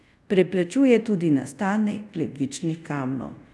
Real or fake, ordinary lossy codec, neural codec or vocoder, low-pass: fake; none; codec, 24 kHz, 0.5 kbps, DualCodec; none